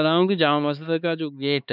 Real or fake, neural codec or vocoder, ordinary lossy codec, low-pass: fake; codec, 16 kHz, 4 kbps, X-Codec, HuBERT features, trained on LibriSpeech; none; 5.4 kHz